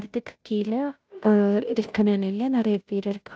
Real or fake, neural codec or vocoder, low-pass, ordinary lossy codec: fake; codec, 16 kHz, 0.5 kbps, FunCodec, trained on Chinese and English, 25 frames a second; none; none